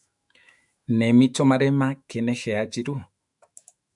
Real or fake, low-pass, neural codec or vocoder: fake; 10.8 kHz; autoencoder, 48 kHz, 128 numbers a frame, DAC-VAE, trained on Japanese speech